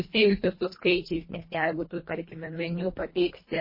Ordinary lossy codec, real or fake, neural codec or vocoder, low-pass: MP3, 24 kbps; fake; codec, 24 kHz, 1.5 kbps, HILCodec; 5.4 kHz